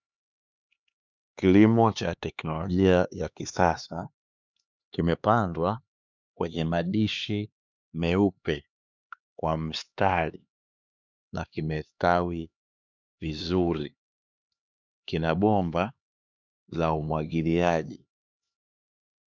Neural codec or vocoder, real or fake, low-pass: codec, 16 kHz, 2 kbps, X-Codec, HuBERT features, trained on LibriSpeech; fake; 7.2 kHz